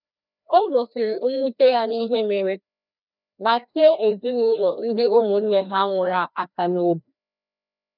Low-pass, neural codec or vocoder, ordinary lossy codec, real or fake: 5.4 kHz; codec, 16 kHz, 1 kbps, FreqCodec, larger model; none; fake